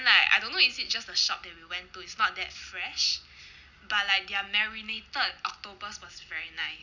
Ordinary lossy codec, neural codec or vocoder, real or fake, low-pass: none; none; real; 7.2 kHz